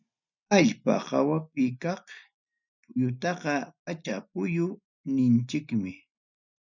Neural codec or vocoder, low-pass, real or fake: none; 7.2 kHz; real